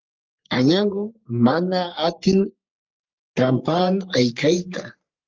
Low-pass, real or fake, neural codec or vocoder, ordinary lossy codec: 7.2 kHz; fake; codec, 44.1 kHz, 3.4 kbps, Pupu-Codec; Opus, 32 kbps